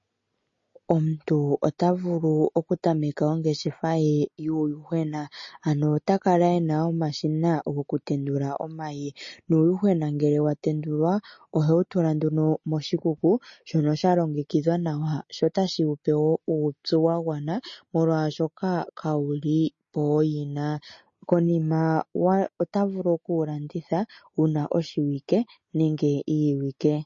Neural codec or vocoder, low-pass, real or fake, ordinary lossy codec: none; 7.2 kHz; real; MP3, 32 kbps